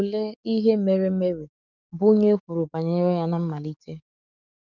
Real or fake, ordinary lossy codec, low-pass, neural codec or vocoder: fake; none; 7.2 kHz; codec, 44.1 kHz, 7.8 kbps, DAC